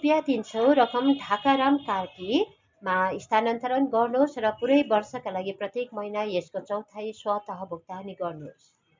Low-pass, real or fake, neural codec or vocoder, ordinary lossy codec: 7.2 kHz; real; none; MP3, 64 kbps